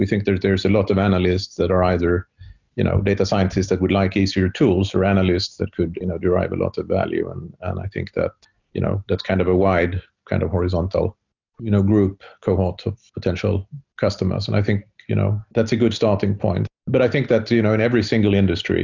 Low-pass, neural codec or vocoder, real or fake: 7.2 kHz; none; real